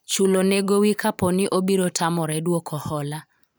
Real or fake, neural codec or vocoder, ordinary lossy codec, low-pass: fake; vocoder, 44.1 kHz, 128 mel bands every 512 samples, BigVGAN v2; none; none